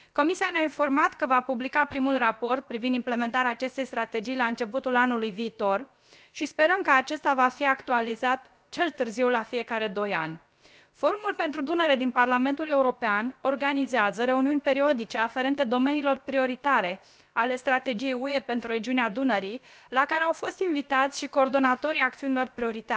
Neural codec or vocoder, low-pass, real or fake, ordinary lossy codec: codec, 16 kHz, about 1 kbps, DyCAST, with the encoder's durations; none; fake; none